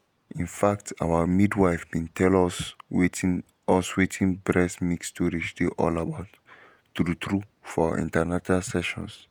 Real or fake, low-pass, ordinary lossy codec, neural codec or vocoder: fake; none; none; vocoder, 48 kHz, 128 mel bands, Vocos